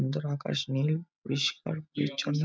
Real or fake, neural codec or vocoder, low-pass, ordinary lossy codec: real; none; none; none